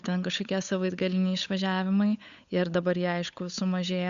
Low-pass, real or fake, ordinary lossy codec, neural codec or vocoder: 7.2 kHz; fake; AAC, 96 kbps; codec, 16 kHz, 8 kbps, FunCodec, trained on Chinese and English, 25 frames a second